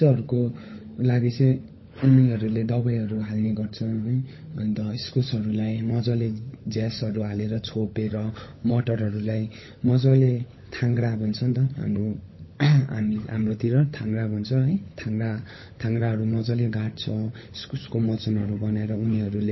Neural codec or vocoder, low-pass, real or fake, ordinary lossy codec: codec, 16 kHz, 16 kbps, FunCodec, trained on LibriTTS, 50 frames a second; 7.2 kHz; fake; MP3, 24 kbps